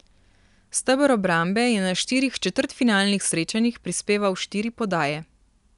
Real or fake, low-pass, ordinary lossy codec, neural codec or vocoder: real; 10.8 kHz; none; none